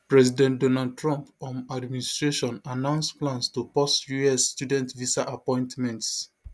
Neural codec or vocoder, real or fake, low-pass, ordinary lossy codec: none; real; none; none